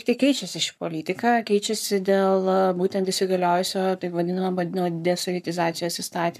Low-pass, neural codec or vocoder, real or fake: 14.4 kHz; codec, 44.1 kHz, 7.8 kbps, Pupu-Codec; fake